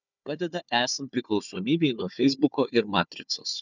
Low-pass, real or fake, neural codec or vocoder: 7.2 kHz; fake; codec, 16 kHz, 4 kbps, FunCodec, trained on Chinese and English, 50 frames a second